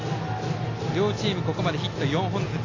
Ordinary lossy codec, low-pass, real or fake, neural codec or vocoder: AAC, 32 kbps; 7.2 kHz; real; none